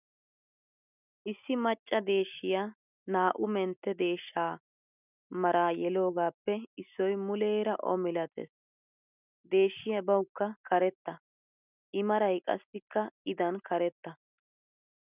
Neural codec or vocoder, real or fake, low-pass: none; real; 3.6 kHz